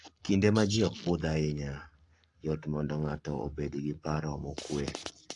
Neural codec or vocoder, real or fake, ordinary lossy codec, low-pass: codec, 44.1 kHz, 7.8 kbps, Pupu-Codec; fake; AAC, 64 kbps; 10.8 kHz